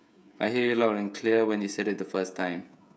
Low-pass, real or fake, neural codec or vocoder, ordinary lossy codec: none; fake; codec, 16 kHz, 16 kbps, FreqCodec, smaller model; none